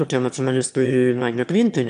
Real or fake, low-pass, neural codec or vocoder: fake; 9.9 kHz; autoencoder, 22.05 kHz, a latent of 192 numbers a frame, VITS, trained on one speaker